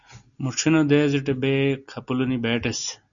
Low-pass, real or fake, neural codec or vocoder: 7.2 kHz; real; none